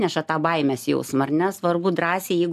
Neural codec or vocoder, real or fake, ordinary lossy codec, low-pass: none; real; AAC, 96 kbps; 14.4 kHz